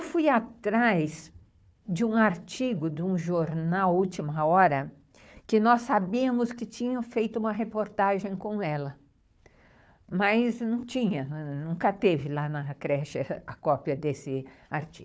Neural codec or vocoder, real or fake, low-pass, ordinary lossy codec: codec, 16 kHz, 4 kbps, FunCodec, trained on Chinese and English, 50 frames a second; fake; none; none